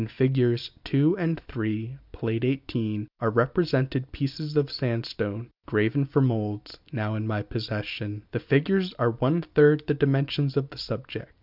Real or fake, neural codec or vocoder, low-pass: real; none; 5.4 kHz